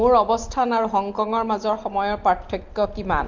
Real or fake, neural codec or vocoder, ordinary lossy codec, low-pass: real; none; Opus, 32 kbps; 7.2 kHz